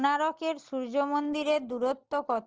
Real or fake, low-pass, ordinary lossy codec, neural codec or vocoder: real; 7.2 kHz; Opus, 16 kbps; none